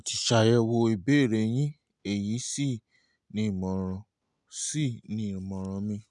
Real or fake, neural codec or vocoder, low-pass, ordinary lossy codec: real; none; 10.8 kHz; none